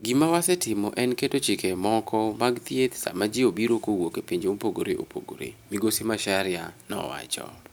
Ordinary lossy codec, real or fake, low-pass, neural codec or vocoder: none; real; none; none